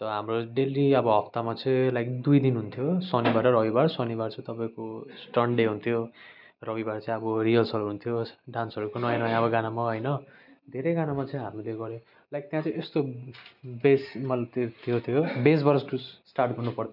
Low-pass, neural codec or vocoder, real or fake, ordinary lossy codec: 5.4 kHz; none; real; none